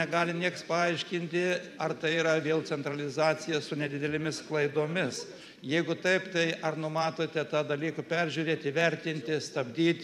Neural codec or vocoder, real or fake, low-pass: vocoder, 44.1 kHz, 128 mel bands every 512 samples, BigVGAN v2; fake; 14.4 kHz